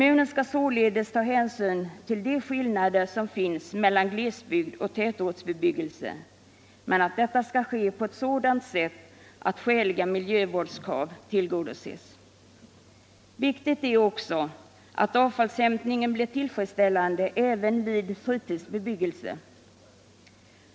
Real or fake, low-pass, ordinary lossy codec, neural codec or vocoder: real; none; none; none